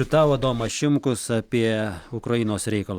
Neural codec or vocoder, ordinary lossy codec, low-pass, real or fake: none; Opus, 64 kbps; 19.8 kHz; real